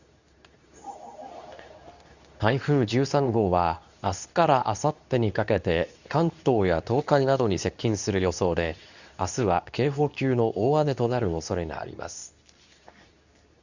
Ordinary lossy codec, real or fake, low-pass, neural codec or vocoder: none; fake; 7.2 kHz; codec, 24 kHz, 0.9 kbps, WavTokenizer, medium speech release version 2